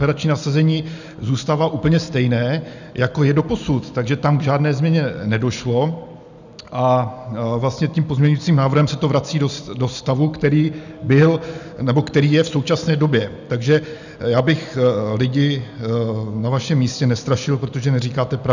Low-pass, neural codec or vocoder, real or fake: 7.2 kHz; none; real